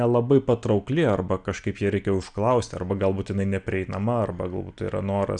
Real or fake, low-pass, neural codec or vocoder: real; 9.9 kHz; none